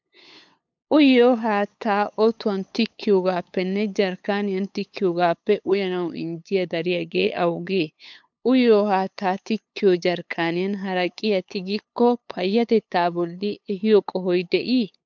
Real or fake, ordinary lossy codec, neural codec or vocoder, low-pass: fake; MP3, 64 kbps; codec, 16 kHz, 8 kbps, FunCodec, trained on LibriTTS, 25 frames a second; 7.2 kHz